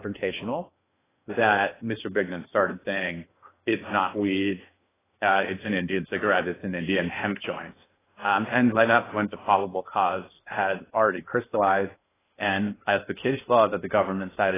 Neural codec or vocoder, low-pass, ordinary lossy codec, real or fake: codec, 16 kHz in and 24 kHz out, 0.6 kbps, FocalCodec, streaming, 2048 codes; 3.6 kHz; AAC, 16 kbps; fake